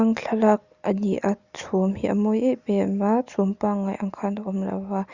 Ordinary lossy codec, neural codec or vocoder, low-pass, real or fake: Opus, 64 kbps; none; 7.2 kHz; real